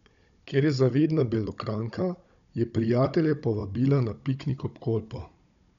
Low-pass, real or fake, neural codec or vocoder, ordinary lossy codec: 7.2 kHz; fake; codec, 16 kHz, 16 kbps, FunCodec, trained on Chinese and English, 50 frames a second; none